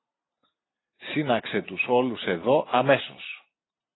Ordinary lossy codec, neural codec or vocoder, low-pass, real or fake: AAC, 16 kbps; none; 7.2 kHz; real